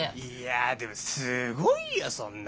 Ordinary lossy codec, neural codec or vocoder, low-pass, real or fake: none; none; none; real